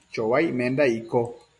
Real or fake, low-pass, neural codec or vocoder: real; 10.8 kHz; none